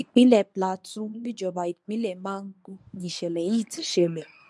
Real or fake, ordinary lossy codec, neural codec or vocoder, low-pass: fake; none; codec, 24 kHz, 0.9 kbps, WavTokenizer, medium speech release version 1; none